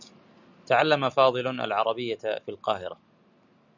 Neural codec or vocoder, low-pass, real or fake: none; 7.2 kHz; real